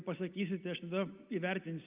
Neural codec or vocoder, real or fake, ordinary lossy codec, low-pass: none; real; Opus, 24 kbps; 3.6 kHz